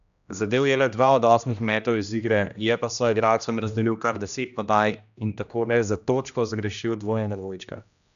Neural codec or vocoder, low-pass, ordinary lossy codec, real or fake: codec, 16 kHz, 1 kbps, X-Codec, HuBERT features, trained on general audio; 7.2 kHz; none; fake